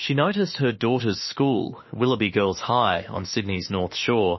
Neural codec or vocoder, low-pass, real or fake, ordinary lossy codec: autoencoder, 48 kHz, 32 numbers a frame, DAC-VAE, trained on Japanese speech; 7.2 kHz; fake; MP3, 24 kbps